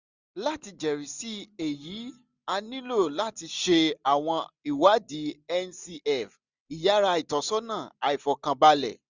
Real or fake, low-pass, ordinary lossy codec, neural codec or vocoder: real; 7.2 kHz; Opus, 64 kbps; none